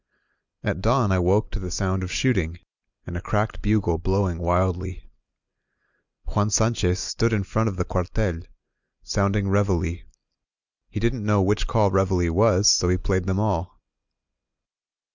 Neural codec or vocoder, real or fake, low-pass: none; real; 7.2 kHz